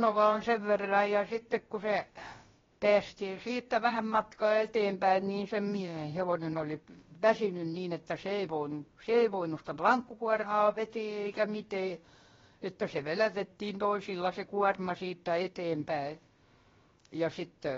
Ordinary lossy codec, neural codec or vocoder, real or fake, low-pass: AAC, 24 kbps; codec, 16 kHz, about 1 kbps, DyCAST, with the encoder's durations; fake; 7.2 kHz